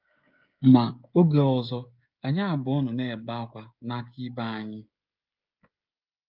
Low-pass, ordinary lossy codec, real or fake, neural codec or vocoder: 5.4 kHz; Opus, 24 kbps; fake; codec, 16 kHz, 16 kbps, FreqCodec, smaller model